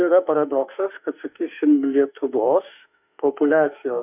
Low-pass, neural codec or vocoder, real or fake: 3.6 kHz; autoencoder, 48 kHz, 32 numbers a frame, DAC-VAE, trained on Japanese speech; fake